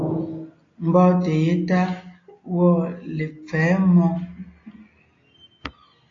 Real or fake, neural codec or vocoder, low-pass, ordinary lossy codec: real; none; 7.2 kHz; AAC, 32 kbps